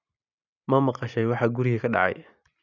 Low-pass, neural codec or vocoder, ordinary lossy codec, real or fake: 7.2 kHz; none; none; real